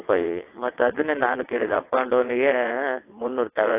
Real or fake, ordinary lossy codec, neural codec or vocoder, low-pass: fake; AAC, 24 kbps; vocoder, 22.05 kHz, 80 mel bands, WaveNeXt; 3.6 kHz